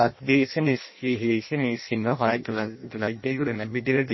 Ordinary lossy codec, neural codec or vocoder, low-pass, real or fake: MP3, 24 kbps; codec, 16 kHz in and 24 kHz out, 0.6 kbps, FireRedTTS-2 codec; 7.2 kHz; fake